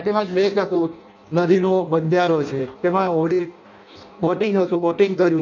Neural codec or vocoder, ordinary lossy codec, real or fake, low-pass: codec, 16 kHz in and 24 kHz out, 0.6 kbps, FireRedTTS-2 codec; none; fake; 7.2 kHz